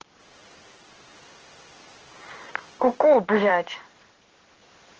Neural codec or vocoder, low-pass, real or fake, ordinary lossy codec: codec, 16 kHz in and 24 kHz out, 1 kbps, XY-Tokenizer; 7.2 kHz; fake; Opus, 16 kbps